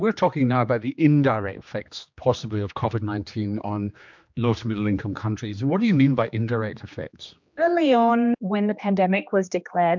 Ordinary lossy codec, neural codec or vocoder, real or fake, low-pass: MP3, 64 kbps; codec, 16 kHz, 2 kbps, X-Codec, HuBERT features, trained on general audio; fake; 7.2 kHz